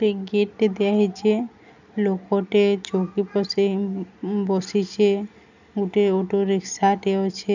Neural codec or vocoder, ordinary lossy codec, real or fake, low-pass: none; none; real; 7.2 kHz